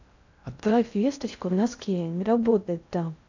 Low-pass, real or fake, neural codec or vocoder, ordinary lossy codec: 7.2 kHz; fake; codec, 16 kHz in and 24 kHz out, 0.6 kbps, FocalCodec, streaming, 2048 codes; none